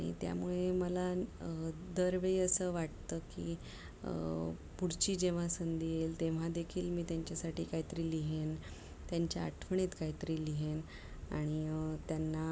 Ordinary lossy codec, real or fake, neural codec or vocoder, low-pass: none; real; none; none